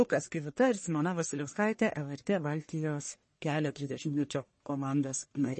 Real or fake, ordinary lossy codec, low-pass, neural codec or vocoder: fake; MP3, 32 kbps; 10.8 kHz; codec, 44.1 kHz, 1.7 kbps, Pupu-Codec